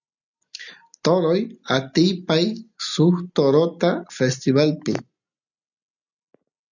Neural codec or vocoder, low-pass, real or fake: none; 7.2 kHz; real